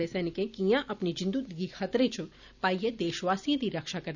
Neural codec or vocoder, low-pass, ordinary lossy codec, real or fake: none; 7.2 kHz; none; real